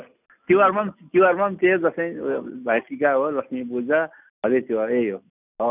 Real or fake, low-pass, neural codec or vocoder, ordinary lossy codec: real; 3.6 kHz; none; none